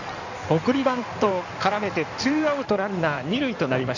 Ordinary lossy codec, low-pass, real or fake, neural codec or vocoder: none; 7.2 kHz; fake; codec, 16 kHz in and 24 kHz out, 2.2 kbps, FireRedTTS-2 codec